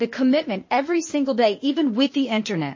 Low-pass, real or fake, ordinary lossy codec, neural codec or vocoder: 7.2 kHz; fake; MP3, 32 kbps; codec, 16 kHz, 0.8 kbps, ZipCodec